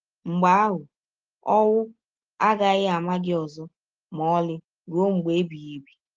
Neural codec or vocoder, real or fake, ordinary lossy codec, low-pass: none; real; Opus, 16 kbps; 7.2 kHz